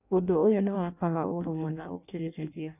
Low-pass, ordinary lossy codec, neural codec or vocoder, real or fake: 3.6 kHz; none; codec, 16 kHz in and 24 kHz out, 0.6 kbps, FireRedTTS-2 codec; fake